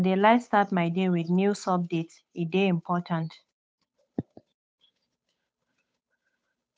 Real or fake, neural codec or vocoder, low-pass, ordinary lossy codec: fake; codec, 16 kHz, 8 kbps, FunCodec, trained on Chinese and English, 25 frames a second; none; none